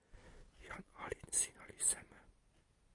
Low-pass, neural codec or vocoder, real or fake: 10.8 kHz; none; real